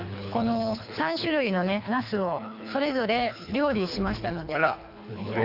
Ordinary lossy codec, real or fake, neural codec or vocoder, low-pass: none; fake; codec, 24 kHz, 3 kbps, HILCodec; 5.4 kHz